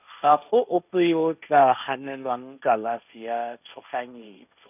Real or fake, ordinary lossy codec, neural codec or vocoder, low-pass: fake; none; codec, 16 kHz, 1.1 kbps, Voila-Tokenizer; 3.6 kHz